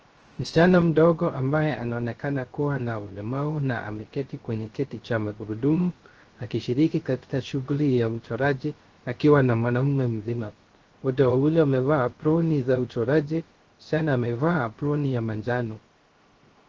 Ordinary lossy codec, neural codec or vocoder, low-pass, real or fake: Opus, 16 kbps; codec, 16 kHz, 0.3 kbps, FocalCodec; 7.2 kHz; fake